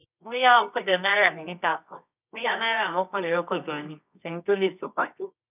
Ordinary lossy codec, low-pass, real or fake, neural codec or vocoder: none; 3.6 kHz; fake; codec, 24 kHz, 0.9 kbps, WavTokenizer, medium music audio release